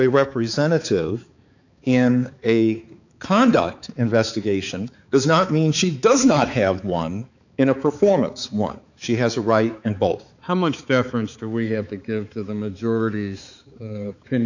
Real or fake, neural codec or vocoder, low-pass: fake; codec, 16 kHz, 4 kbps, X-Codec, HuBERT features, trained on balanced general audio; 7.2 kHz